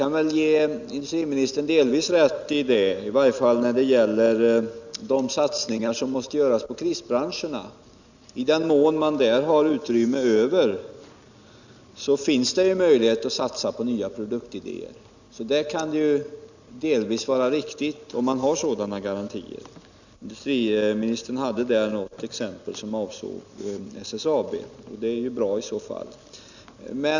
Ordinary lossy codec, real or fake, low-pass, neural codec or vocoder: none; real; 7.2 kHz; none